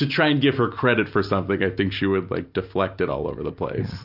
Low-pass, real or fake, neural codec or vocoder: 5.4 kHz; real; none